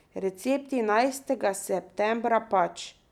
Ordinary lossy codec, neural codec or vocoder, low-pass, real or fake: none; none; 19.8 kHz; real